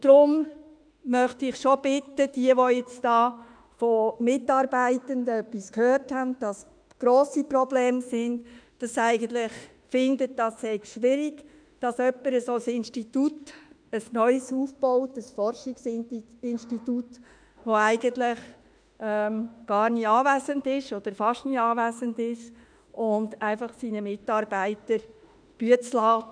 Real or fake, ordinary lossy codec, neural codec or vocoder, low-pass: fake; none; autoencoder, 48 kHz, 32 numbers a frame, DAC-VAE, trained on Japanese speech; 9.9 kHz